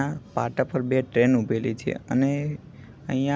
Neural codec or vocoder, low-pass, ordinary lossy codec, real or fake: none; none; none; real